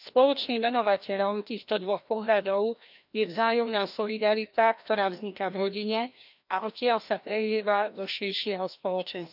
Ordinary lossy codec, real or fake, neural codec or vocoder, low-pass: none; fake; codec, 16 kHz, 1 kbps, FreqCodec, larger model; 5.4 kHz